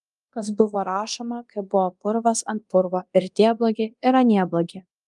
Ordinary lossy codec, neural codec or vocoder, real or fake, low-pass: Opus, 32 kbps; codec, 24 kHz, 0.9 kbps, DualCodec; fake; 10.8 kHz